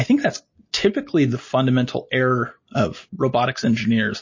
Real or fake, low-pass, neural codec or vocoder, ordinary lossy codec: real; 7.2 kHz; none; MP3, 32 kbps